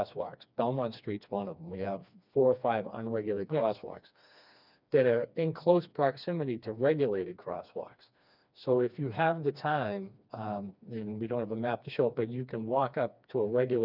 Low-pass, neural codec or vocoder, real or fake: 5.4 kHz; codec, 16 kHz, 2 kbps, FreqCodec, smaller model; fake